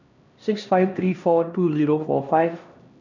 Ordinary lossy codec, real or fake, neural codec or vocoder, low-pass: none; fake; codec, 16 kHz, 1 kbps, X-Codec, HuBERT features, trained on LibriSpeech; 7.2 kHz